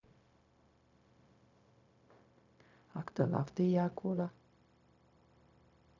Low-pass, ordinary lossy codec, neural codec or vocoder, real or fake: 7.2 kHz; none; codec, 16 kHz, 0.4 kbps, LongCat-Audio-Codec; fake